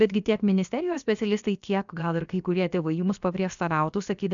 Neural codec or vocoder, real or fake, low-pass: codec, 16 kHz, about 1 kbps, DyCAST, with the encoder's durations; fake; 7.2 kHz